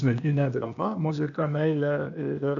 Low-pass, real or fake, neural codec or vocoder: 7.2 kHz; fake; codec, 16 kHz, 0.8 kbps, ZipCodec